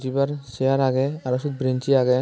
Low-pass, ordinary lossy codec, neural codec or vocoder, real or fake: none; none; none; real